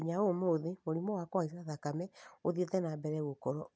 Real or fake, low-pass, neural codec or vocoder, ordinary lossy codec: real; none; none; none